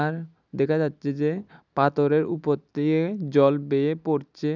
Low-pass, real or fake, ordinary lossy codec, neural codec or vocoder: 7.2 kHz; real; none; none